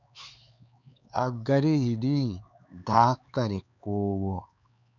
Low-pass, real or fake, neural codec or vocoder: 7.2 kHz; fake; codec, 16 kHz, 4 kbps, X-Codec, HuBERT features, trained on LibriSpeech